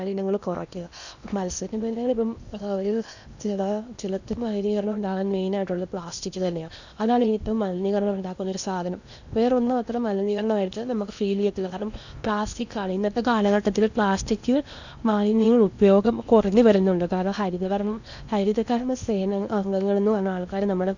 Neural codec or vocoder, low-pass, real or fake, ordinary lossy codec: codec, 16 kHz in and 24 kHz out, 0.8 kbps, FocalCodec, streaming, 65536 codes; 7.2 kHz; fake; none